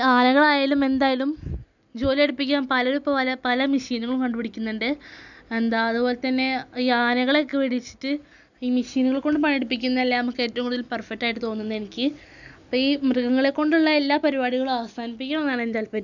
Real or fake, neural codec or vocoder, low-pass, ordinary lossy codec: real; none; 7.2 kHz; none